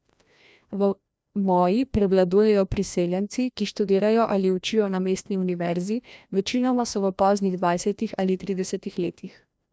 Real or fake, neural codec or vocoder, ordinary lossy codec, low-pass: fake; codec, 16 kHz, 1 kbps, FreqCodec, larger model; none; none